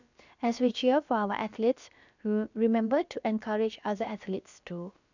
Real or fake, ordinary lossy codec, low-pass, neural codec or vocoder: fake; none; 7.2 kHz; codec, 16 kHz, about 1 kbps, DyCAST, with the encoder's durations